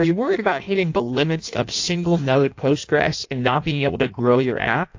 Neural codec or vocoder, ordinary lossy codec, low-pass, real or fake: codec, 16 kHz in and 24 kHz out, 0.6 kbps, FireRedTTS-2 codec; AAC, 48 kbps; 7.2 kHz; fake